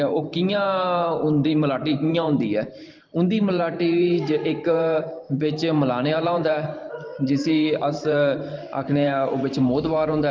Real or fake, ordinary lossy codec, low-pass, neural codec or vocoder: fake; Opus, 32 kbps; 7.2 kHz; vocoder, 44.1 kHz, 128 mel bands every 512 samples, BigVGAN v2